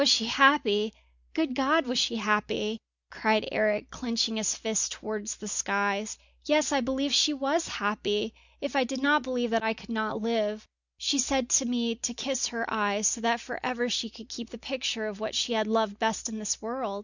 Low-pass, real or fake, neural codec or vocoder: 7.2 kHz; real; none